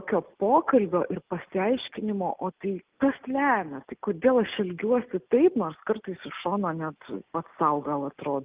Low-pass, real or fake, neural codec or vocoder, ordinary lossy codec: 3.6 kHz; real; none; Opus, 32 kbps